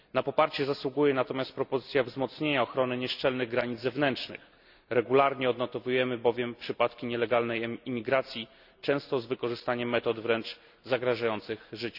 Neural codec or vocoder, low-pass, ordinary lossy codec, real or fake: none; 5.4 kHz; none; real